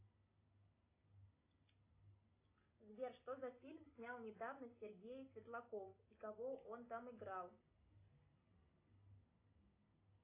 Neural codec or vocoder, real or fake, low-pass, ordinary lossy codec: none; real; 3.6 kHz; AAC, 24 kbps